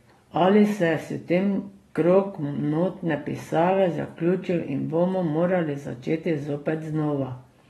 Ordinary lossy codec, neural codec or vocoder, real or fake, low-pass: AAC, 32 kbps; none; real; 19.8 kHz